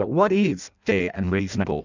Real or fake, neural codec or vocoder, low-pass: fake; codec, 16 kHz in and 24 kHz out, 0.6 kbps, FireRedTTS-2 codec; 7.2 kHz